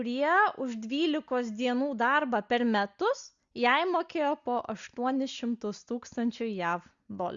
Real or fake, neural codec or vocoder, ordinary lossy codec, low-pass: real; none; Opus, 64 kbps; 7.2 kHz